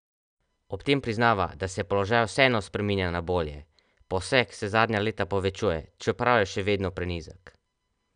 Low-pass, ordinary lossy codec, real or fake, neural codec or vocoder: 9.9 kHz; none; real; none